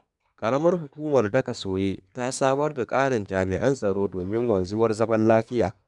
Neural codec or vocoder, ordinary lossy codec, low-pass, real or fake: codec, 24 kHz, 1 kbps, SNAC; none; 10.8 kHz; fake